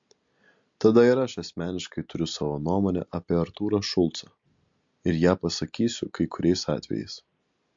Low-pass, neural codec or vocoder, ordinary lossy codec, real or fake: 7.2 kHz; none; MP3, 48 kbps; real